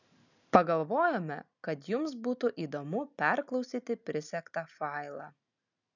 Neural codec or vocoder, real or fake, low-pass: none; real; 7.2 kHz